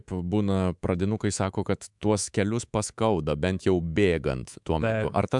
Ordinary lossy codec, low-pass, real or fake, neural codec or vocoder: MP3, 96 kbps; 10.8 kHz; fake; codec, 24 kHz, 3.1 kbps, DualCodec